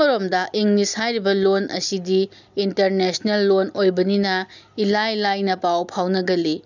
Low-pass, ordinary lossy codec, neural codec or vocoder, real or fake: 7.2 kHz; none; none; real